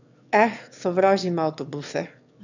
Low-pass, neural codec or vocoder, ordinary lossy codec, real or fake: 7.2 kHz; autoencoder, 22.05 kHz, a latent of 192 numbers a frame, VITS, trained on one speaker; none; fake